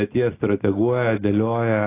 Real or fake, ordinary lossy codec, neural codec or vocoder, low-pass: real; AAC, 16 kbps; none; 3.6 kHz